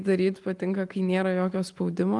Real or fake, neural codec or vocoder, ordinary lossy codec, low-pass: real; none; Opus, 32 kbps; 10.8 kHz